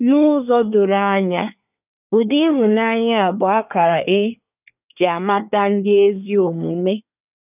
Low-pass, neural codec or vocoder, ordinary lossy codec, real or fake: 3.6 kHz; codec, 24 kHz, 1 kbps, SNAC; none; fake